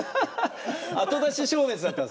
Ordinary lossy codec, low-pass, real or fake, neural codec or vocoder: none; none; real; none